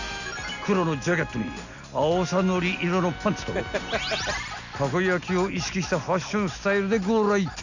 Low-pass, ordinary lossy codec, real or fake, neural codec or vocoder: 7.2 kHz; none; real; none